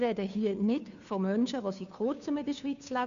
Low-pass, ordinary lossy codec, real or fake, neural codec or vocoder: 7.2 kHz; none; fake; codec, 16 kHz, 2 kbps, FunCodec, trained on Chinese and English, 25 frames a second